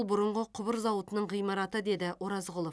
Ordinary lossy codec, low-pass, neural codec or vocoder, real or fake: none; none; none; real